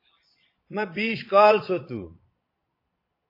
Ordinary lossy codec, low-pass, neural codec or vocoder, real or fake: AAC, 32 kbps; 5.4 kHz; vocoder, 22.05 kHz, 80 mel bands, Vocos; fake